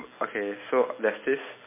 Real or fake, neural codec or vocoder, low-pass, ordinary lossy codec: real; none; 3.6 kHz; MP3, 16 kbps